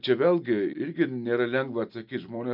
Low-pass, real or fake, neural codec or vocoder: 5.4 kHz; real; none